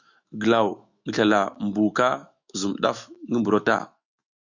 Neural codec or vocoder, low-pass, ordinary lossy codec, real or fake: autoencoder, 48 kHz, 128 numbers a frame, DAC-VAE, trained on Japanese speech; 7.2 kHz; Opus, 64 kbps; fake